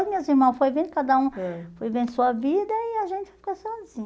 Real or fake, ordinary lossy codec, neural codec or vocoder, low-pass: real; none; none; none